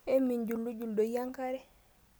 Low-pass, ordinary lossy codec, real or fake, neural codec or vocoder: none; none; real; none